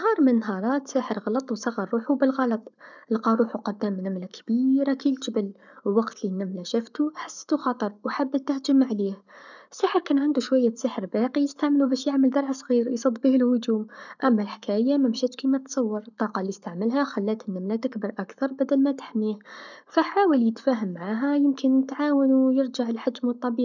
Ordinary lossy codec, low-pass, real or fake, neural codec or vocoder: none; 7.2 kHz; fake; codec, 44.1 kHz, 7.8 kbps, Pupu-Codec